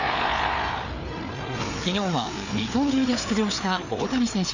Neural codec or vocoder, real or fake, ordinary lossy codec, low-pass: codec, 16 kHz, 4 kbps, FreqCodec, larger model; fake; none; 7.2 kHz